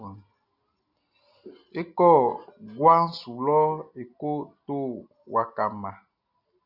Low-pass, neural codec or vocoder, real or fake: 5.4 kHz; none; real